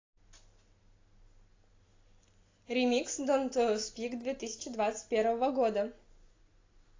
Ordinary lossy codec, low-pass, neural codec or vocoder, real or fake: AAC, 32 kbps; 7.2 kHz; none; real